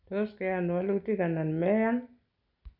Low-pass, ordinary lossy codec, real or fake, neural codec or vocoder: 5.4 kHz; none; real; none